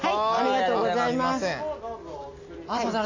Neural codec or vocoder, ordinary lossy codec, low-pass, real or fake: none; AAC, 48 kbps; 7.2 kHz; real